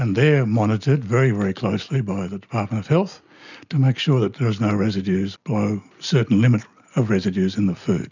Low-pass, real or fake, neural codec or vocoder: 7.2 kHz; real; none